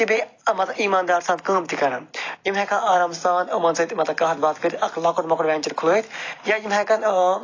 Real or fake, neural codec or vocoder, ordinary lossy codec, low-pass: real; none; AAC, 32 kbps; 7.2 kHz